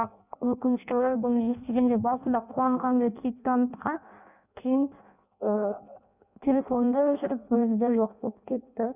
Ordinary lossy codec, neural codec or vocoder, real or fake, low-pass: none; codec, 16 kHz in and 24 kHz out, 0.6 kbps, FireRedTTS-2 codec; fake; 3.6 kHz